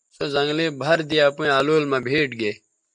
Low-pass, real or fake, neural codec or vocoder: 10.8 kHz; real; none